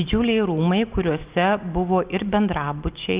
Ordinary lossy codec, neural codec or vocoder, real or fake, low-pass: Opus, 24 kbps; none; real; 3.6 kHz